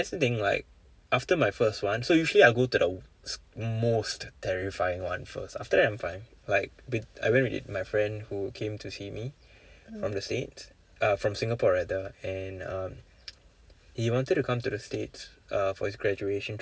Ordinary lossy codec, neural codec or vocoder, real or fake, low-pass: none; none; real; none